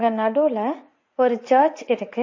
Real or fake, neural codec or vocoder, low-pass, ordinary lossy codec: fake; vocoder, 44.1 kHz, 80 mel bands, Vocos; 7.2 kHz; MP3, 32 kbps